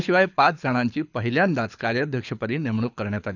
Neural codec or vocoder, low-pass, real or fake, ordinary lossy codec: codec, 24 kHz, 6 kbps, HILCodec; 7.2 kHz; fake; none